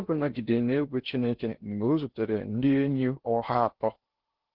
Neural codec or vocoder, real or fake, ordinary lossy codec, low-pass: codec, 16 kHz in and 24 kHz out, 0.6 kbps, FocalCodec, streaming, 4096 codes; fake; Opus, 16 kbps; 5.4 kHz